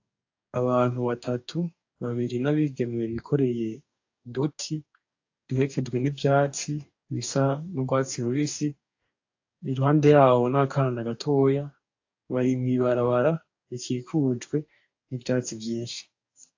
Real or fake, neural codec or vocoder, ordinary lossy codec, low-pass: fake; codec, 44.1 kHz, 2.6 kbps, DAC; AAC, 48 kbps; 7.2 kHz